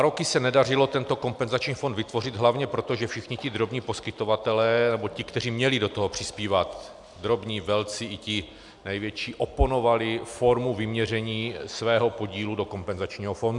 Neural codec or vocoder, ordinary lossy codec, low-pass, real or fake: none; MP3, 96 kbps; 10.8 kHz; real